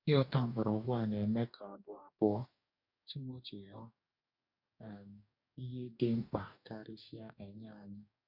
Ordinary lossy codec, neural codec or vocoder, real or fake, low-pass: none; codec, 44.1 kHz, 2.6 kbps, DAC; fake; 5.4 kHz